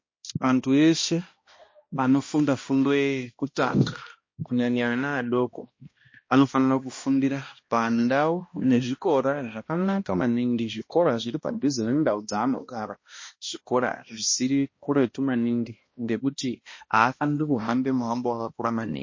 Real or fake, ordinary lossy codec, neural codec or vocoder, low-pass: fake; MP3, 32 kbps; codec, 16 kHz, 1 kbps, X-Codec, HuBERT features, trained on balanced general audio; 7.2 kHz